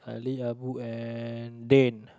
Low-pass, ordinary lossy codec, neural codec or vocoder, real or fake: none; none; none; real